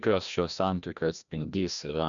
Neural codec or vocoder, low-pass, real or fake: codec, 16 kHz, 1 kbps, FreqCodec, larger model; 7.2 kHz; fake